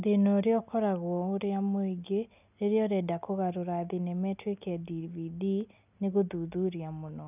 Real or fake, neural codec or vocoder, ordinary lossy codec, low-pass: real; none; none; 3.6 kHz